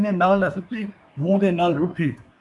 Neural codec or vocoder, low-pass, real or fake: codec, 24 kHz, 1 kbps, SNAC; 10.8 kHz; fake